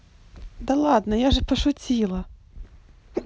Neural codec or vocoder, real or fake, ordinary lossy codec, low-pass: none; real; none; none